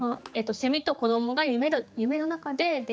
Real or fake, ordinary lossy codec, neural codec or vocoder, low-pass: fake; none; codec, 16 kHz, 4 kbps, X-Codec, HuBERT features, trained on general audio; none